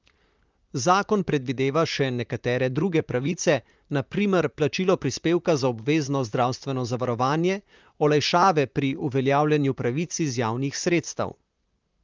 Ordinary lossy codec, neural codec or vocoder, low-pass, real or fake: Opus, 24 kbps; vocoder, 44.1 kHz, 128 mel bands, Pupu-Vocoder; 7.2 kHz; fake